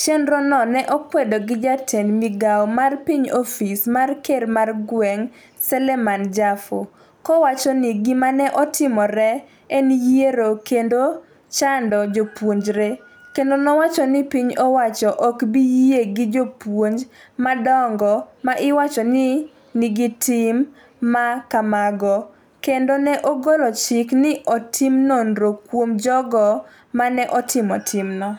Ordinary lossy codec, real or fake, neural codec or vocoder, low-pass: none; real; none; none